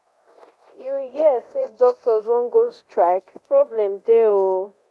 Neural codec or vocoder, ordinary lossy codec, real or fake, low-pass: codec, 24 kHz, 0.9 kbps, DualCodec; none; fake; none